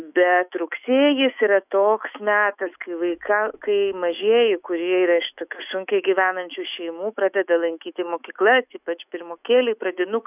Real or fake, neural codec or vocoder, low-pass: fake; autoencoder, 48 kHz, 128 numbers a frame, DAC-VAE, trained on Japanese speech; 3.6 kHz